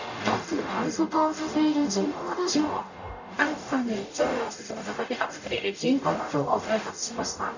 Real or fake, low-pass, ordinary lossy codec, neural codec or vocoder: fake; 7.2 kHz; none; codec, 44.1 kHz, 0.9 kbps, DAC